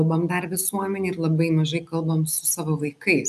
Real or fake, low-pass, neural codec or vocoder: real; 14.4 kHz; none